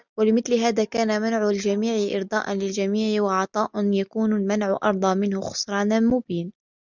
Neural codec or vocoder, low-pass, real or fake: none; 7.2 kHz; real